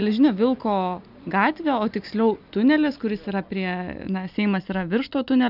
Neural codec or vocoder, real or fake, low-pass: none; real; 5.4 kHz